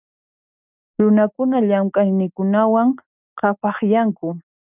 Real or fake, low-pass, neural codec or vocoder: real; 3.6 kHz; none